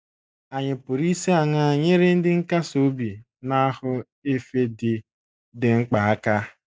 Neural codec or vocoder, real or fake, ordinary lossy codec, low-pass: none; real; none; none